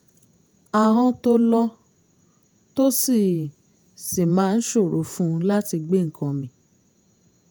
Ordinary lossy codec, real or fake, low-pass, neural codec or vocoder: none; fake; none; vocoder, 48 kHz, 128 mel bands, Vocos